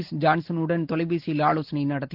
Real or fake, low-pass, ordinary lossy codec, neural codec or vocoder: real; 5.4 kHz; Opus, 16 kbps; none